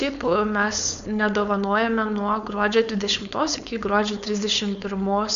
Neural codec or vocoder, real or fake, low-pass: codec, 16 kHz, 4.8 kbps, FACodec; fake; 7.2 kHz